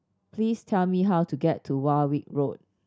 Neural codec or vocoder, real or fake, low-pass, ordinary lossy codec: none; real; none; none